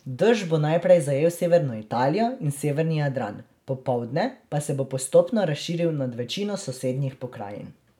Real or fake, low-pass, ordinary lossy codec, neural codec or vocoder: real; 19.8 kHz; none; none